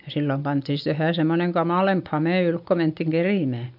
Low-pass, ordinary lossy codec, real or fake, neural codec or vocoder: 5.4 kHz; AAC, 48 kbps; real; none